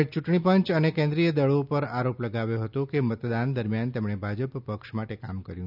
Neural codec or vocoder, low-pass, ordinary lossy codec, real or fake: none; 5.4 kHz; none; real